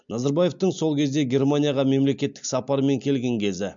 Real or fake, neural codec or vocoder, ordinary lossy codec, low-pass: real; none; MP3, 64 kbps; 7.2 kHz